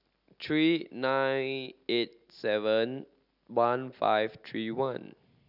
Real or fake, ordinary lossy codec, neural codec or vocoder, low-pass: real; none; none; 5.4 kHz